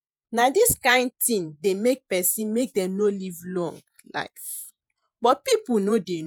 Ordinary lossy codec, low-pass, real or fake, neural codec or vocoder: none; none; fake; vocoder, 48 kHz, 128 mel bands, Vocos